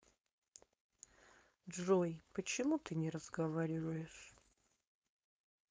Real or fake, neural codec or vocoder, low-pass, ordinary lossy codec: fake; codec, 16 kHz, 4.8 kbps, FACodec; none; none